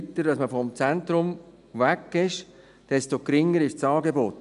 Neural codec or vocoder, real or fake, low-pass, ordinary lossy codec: none; real; 10.8 kHz; none